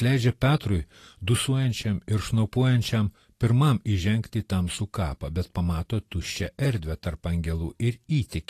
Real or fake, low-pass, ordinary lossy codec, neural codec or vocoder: real; 14.4 kHz; AAC, 48 kbps; none